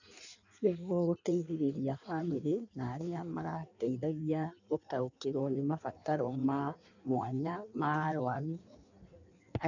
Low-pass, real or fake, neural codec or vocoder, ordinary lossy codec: 7.2 kHz; fake; codec, 16 kHz in and 24 kHz out, 1.1 kbps, FireRedTTS-2 codec; none